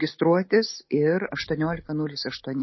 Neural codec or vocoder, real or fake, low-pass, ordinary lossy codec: none; real; 7.2 kHz; MP3, 24 kbps